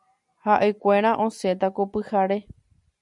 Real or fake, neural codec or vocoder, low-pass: real; none; 10.8 kHz